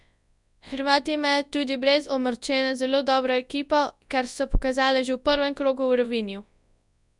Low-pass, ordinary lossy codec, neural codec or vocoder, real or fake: 10.8 kHz; none; codec, 24 kHz, 0.9 kbps, WavTokenizer, large speech release; fake